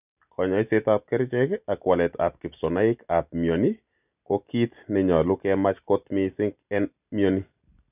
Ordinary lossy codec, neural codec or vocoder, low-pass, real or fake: none; none; 3.6 kHz; real